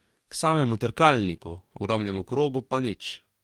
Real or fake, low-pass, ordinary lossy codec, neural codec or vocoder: fake; 19.8 kHz; Opus, 32 kbps; codec, 44.1 kHz, 2.6 kbps, DAC